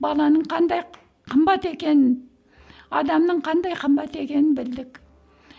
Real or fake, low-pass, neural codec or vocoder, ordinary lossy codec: real; none; none; none